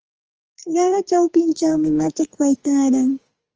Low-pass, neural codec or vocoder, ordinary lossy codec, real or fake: 7.2 kHz; codec, 44.1 kHz, 2.6 kbps, SNAC; Opus, 24 kbps; fake